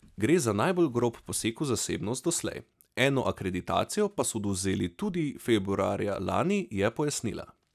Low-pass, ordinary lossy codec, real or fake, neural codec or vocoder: 14.4 kHz; none; real; none